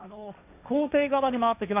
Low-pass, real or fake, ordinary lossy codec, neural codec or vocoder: 3.6 kHz; fake; none; codec, 16 kHz, 1.1 kbps, Voila-Tokenizer